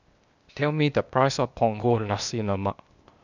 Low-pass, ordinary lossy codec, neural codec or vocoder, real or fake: 7.2 kHz; none; codec, 16 kHz, 0.8 kbps, ZipCodec; fake